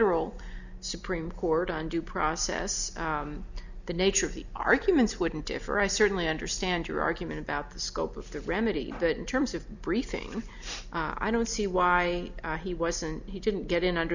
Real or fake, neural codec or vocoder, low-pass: real; none; 7.2 kHz